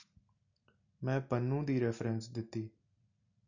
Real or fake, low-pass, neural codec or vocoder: real; 7.2 kHz; none